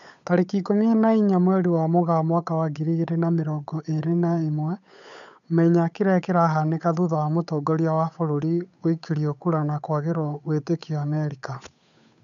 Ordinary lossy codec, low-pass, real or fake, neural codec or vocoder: none; 7.2 kHz; fake; codec, 16 kHz, 8 kbps, FunCodec, trained on Chinese and English, 25 frames a second